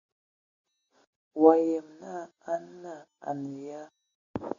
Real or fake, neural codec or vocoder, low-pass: real; none; 7.2 kHz